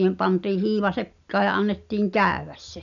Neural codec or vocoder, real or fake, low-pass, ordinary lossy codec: none; real; 7.2 kHz; none